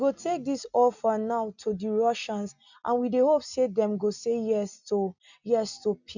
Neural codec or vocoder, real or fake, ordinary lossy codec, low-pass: none; real; none; 7.2 kHz